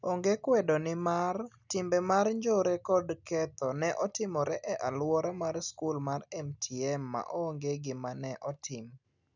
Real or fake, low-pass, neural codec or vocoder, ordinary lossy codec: real; 7.2 kHz; none; none